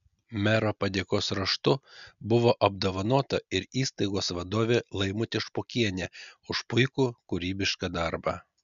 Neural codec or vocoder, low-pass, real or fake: none; 7.2 kHz; real